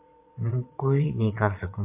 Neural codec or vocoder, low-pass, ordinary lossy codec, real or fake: vocoder, 22.05 kHz, 80 mel bands, Vocos; 3.6 kHz; AAC, 32 kbps; fake